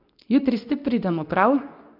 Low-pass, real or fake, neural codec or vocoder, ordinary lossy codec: 5.4 kHz; fake; codec, 24 kHz, 0.9 kbps, WavTokenizer, medium speech release version 2; AAC, 48 kbps